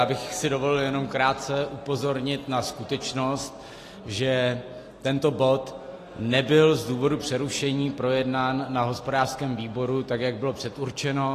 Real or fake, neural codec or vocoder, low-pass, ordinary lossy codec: real; none; 14.4 kHz; AAC, 48 kbps